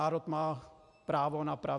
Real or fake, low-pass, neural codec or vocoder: real; 10.8 kHz; none